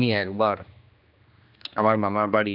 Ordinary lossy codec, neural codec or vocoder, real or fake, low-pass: none; codec, 16 kHz, 2 kbps, X-Codec, HuBERT features, trained on general audio; fake; 5.4 kHz